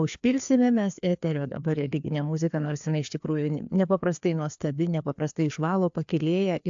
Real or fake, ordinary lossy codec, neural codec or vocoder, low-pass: fake; AAC, 64 kbps; codec, 16 kHz, 2 kbps, FreqCodec, larger model; 7.2 kHz